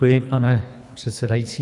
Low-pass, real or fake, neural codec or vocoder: 10.8 kHz; fake; codec, 24 kHz, 3 kbps, HILCodec